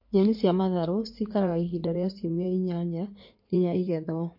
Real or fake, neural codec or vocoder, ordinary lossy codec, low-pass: fake; codec, 16 kHz in and 24 kHz out, 2.2 kbps, FireRedTTS-2 codec; MP3, 32 kbps; 5.4 kHz